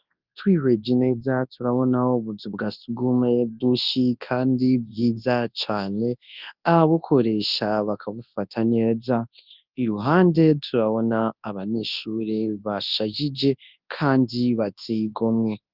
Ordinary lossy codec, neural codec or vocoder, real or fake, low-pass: Opus, 32 kbps; codec, 24 kHz, 0.9 kbps, WavTokenizer, large speech release; fake; 5.4 kHz